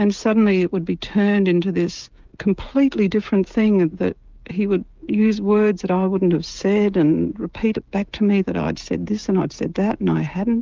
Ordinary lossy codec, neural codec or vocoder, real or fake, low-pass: Opus, 24 kbps; codec, 16 kHz, 16 kbps, FreqCodec, smaller model; fake; 7.2 kHz